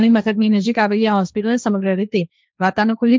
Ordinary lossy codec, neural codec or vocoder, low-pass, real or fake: none; codec, 16 kHz, 1.1 kbps, Voila-Tokenizer; none; fake